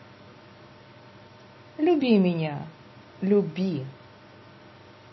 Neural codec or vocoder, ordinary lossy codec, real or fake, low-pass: none; MP3, 24 kbps; real; 7.2 kHz